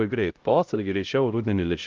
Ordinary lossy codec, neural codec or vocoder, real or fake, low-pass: Opus, 32 kbps; codec, 16 kHz, 0.5 kbps, X-Codec, HuBERT features, trained on LibriSpeech; fake; 7.2 kHz